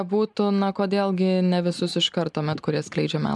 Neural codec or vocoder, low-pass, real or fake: none; 10.8 kHz; real